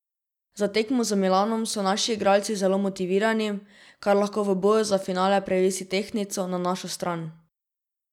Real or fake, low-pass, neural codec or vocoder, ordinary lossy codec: real; 19.8 kHz; none; none